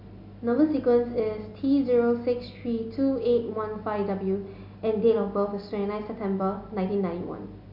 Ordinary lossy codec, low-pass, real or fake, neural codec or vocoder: none; 5.4 kHz; real; none